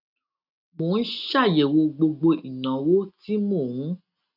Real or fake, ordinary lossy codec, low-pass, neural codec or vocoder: real; none; 5.4 kHz; none